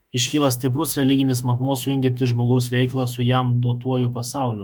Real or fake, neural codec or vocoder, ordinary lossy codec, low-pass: fake; autoencoder, 48 kHz, 32 numbers a frame, DAC-VAE, trained on Japanese speech; MP3, 96 kbps; 19.8 kHz